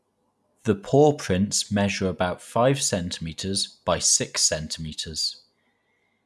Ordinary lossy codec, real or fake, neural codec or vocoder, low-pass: none; real; none; none